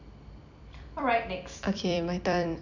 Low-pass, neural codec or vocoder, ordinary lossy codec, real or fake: 7.2 kHz; vocoder, 44.1 kHz, 128 mel bands every 256 samples, BigVGAN v2; none; fake